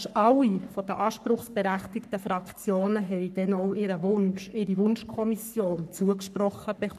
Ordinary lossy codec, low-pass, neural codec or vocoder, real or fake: none; 14.4 kHz; codec, 44.1 kHz, 3.4 kbps, Pupu-Codec; fake